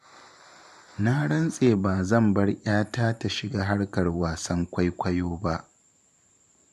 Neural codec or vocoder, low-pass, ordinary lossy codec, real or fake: vocoder, 44.1 kHz, 128 mel bands every 512 samples, BigVGAN v2; 14.4 kHz; MP3, 64 kbps; fake